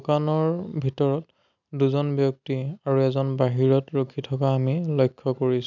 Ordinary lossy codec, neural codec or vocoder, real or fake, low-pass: none; none; real; 7.2 kHz